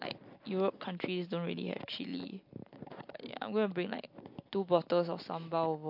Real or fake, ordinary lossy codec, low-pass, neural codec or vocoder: real; MP3, 48 kbps; 5.4 kHz; none